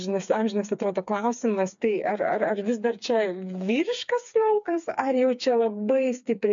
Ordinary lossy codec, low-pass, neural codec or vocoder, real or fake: MP3, 64 kbps; 7.2 kHz; codec, 16 kHz, 4 kbps, FreqCodec, smaller model; fake